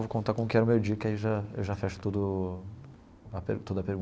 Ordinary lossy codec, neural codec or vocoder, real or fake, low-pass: none; none; real; none